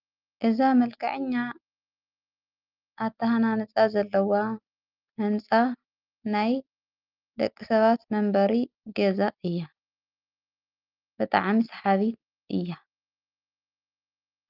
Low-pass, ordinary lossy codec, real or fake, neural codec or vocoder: 5.4 kHz; Opus, 24 kbps; real; none